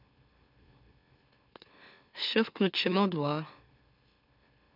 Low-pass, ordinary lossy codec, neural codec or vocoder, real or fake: 5.4 kHz; none; autoencoder, 44.1 kHz, a latent of 192 numbers a frame, MeloTTS; fake